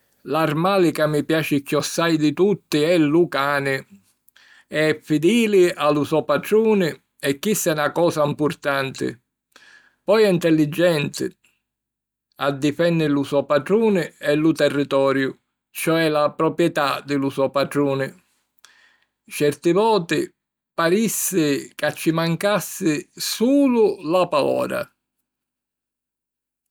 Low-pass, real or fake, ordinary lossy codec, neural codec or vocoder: none; real; none; none